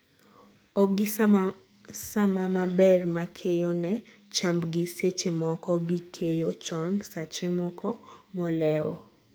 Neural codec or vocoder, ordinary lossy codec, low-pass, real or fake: codec, 44.1 kHz, 2.6 kbps, SNAC; none; none; fake